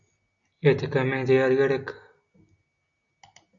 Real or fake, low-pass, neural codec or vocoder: real; 7.2 kHz; none